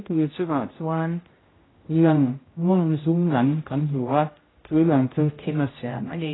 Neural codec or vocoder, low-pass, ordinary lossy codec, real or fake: codec, 16 kHz, 0.5 kbps, X-Codec, HuBERT features, trained on general audio; 7.2 kHz; AAC, 16 kbps; fake